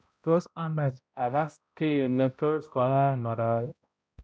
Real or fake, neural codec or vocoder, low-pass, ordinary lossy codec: fake; codec, 16 kHz, 0.5 kbps, X-Codec, HuBERT features, trained on balanced general audio; none; none